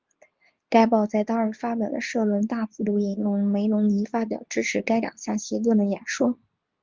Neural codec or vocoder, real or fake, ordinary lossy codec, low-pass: codec, 24 kHz, 0.9 kbps, WavTokenizer, medium speech release version 2; fake; Opus, 32 kbps; 7.2 kHz